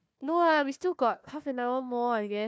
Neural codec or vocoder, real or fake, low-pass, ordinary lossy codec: codec, 16 kHz, 1 kbps, FunCodec, trained on Chinese and English, 50 frames a second; fake; none; none